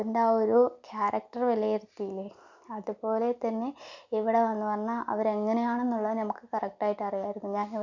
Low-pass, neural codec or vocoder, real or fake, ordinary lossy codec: 7.2 kHz; none; real; none